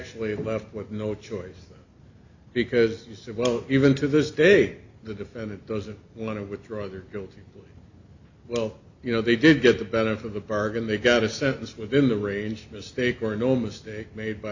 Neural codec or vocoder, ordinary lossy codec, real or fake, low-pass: none; Opus, 64 kbps; real; 7.2 kHz